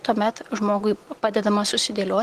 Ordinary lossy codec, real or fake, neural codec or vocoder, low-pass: Opus, 16 kbps; real; none; 14.4 kHz